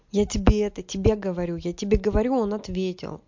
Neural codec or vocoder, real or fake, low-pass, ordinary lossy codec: none; real; 7.2 kHz; MP3, 48 kbps